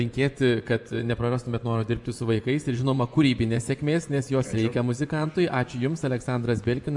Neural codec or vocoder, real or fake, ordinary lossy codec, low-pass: vocoder, 24 kHz, 100 mel bands, Vocos; fake; MP3, 96 kbps; 10.8 kHz